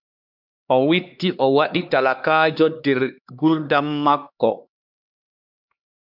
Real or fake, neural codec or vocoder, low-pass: fake; codec, 16 kHz, 2 kbps, X-Codec, HuBERT features, trained on LibriSpeech; 5.4 kHz